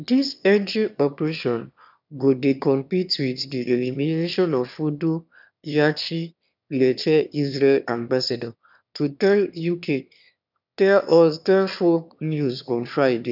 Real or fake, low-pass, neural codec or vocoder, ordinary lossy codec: fake; 5.4 kHz; autoencoder, 22.05 kHz, a latent of 192 numbers a frame, VITS, trained on one speaker; none